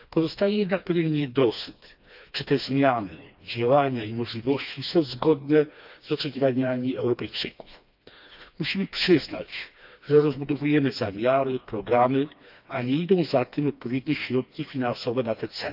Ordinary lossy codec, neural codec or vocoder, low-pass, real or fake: AAC, 48 kbps; codec, 16 kHz, 2 kbps, FreqCodec, smaller model; 5.4 kHz; fake